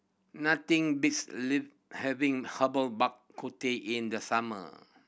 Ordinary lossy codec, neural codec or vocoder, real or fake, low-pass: none; none; real; none